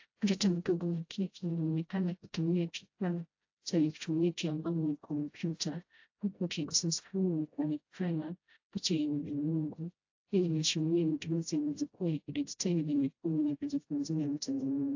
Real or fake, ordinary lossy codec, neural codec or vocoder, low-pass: fake; AAC, 48 kbps; codec, 16 kHz, 0.5 kbps, FreqCodec, smaller model; 7.2 kHz